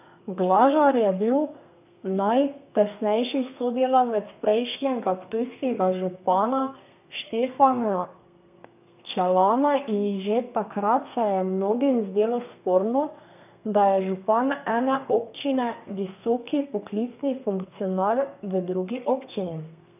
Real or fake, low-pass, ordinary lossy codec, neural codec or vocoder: fake; 3.6 kHz; none; codec, 32 kHz, 1.9 kbps, SNAC